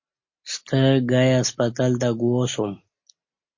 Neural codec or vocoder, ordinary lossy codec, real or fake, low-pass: none; MP3, 32 kbps; real; 7.2 kHz